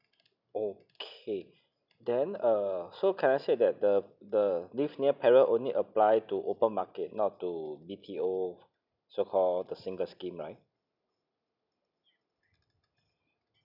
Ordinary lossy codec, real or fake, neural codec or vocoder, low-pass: none; real; none; 5.4 kHz